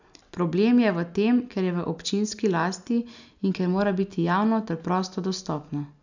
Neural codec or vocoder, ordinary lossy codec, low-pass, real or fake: none; none; 7.2 kHz; real